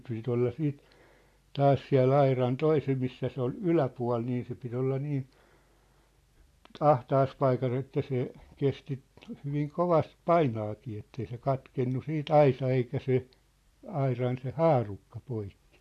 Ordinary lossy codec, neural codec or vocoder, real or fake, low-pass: AAC, 64 kbps; none; real; 14.4 kHz